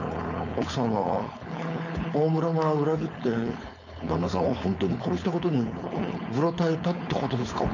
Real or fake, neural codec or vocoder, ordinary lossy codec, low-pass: fake; codec, 16 kHz, 4.8 kbps, FACodec; none; 7.2 kHz